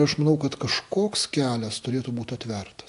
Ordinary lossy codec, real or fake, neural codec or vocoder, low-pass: AAC, 64 kbps; real; none; 10.8 kHz